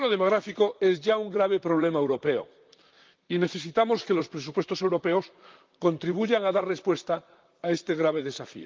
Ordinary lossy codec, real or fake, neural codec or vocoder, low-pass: Opus, 24 kbps; fake; vocoder, 44.1 kHz, 128 mel bands, Pupu-Vocoder; 7.2 kHz